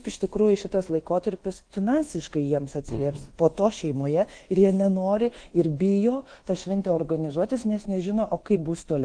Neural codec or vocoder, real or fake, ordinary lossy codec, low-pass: codec, 24 kHz, 1.2 kbps, DualCodec; fake; Opus, 16 kbps; 9.9 kHz